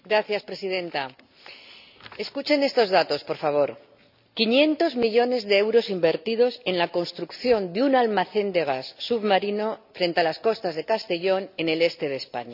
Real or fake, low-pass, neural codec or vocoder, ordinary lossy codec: real; 5.4 kHz; none; none